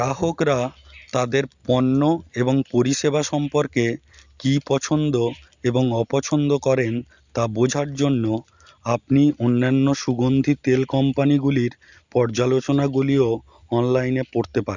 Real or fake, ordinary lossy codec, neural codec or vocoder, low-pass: real; Opus, 64 kbps; none; 7.2 kHz